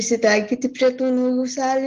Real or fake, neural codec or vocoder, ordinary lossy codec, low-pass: real; none; Opus, 16 kbps; 7.2 kHz